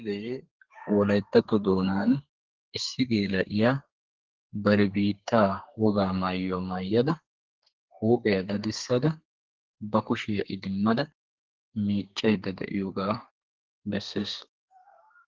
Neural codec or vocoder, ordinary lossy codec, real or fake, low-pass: codec, 32 kHz, 1.9 kbps, SNAC; Opus, 16 kbps; fake; 7.2 kHz